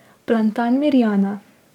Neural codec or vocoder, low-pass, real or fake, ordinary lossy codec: codec, 44.1 kHz, 7.8 kbps, DAC; 19.8 kHz; fake; none